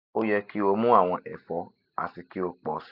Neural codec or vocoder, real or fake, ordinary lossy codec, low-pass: none; real; none; 5.4 kHz